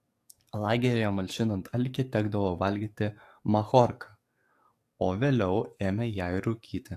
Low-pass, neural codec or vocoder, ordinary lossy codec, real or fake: 14.4 kHz; codec, 44.1 kHz, 7.8 kbps, DAC; AAC, 64 kbps; fake